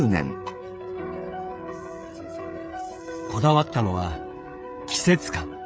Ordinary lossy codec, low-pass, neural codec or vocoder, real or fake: none; none; codec, 16 kHz, 16 kbps, FreqCodec, smaller model; fake